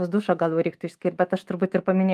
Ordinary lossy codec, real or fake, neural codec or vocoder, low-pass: Opus, 32 kbps; fake; autoencoder, 48 kHz, 128 numbers a frame, DAC-VAE, trained on Japanese speech; 14.4 kHz